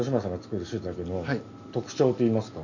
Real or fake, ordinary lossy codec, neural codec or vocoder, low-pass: real; none; none; 7.2 kHz